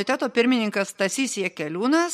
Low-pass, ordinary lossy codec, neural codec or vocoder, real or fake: 19.8 kHz; MP3, 64 kbps; none; real